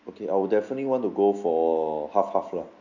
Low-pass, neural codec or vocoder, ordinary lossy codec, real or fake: 7.2 kHz; none; none; real